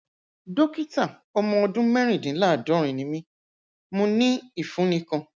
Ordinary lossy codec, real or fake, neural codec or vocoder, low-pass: none; real; none; none